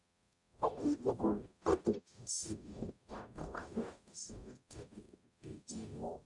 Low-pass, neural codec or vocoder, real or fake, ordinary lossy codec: 10.8 kHz; codec, 44.1 kHz, 0.9 kbps, DAC; fake; none